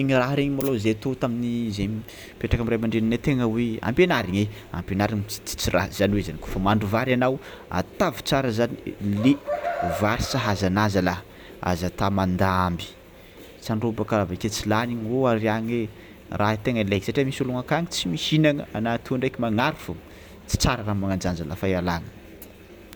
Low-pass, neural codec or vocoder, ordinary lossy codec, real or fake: none; none; none; real